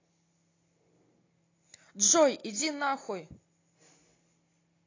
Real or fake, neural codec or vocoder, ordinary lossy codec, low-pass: real; none; AAC, 32 kbps; 7.2 kHz